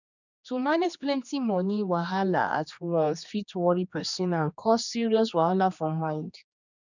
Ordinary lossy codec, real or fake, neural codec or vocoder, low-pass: none; fake; codec, 16 kHz, 2 kbps, X-Codec, HuBERT features, trained on general audio; 7.2 kHz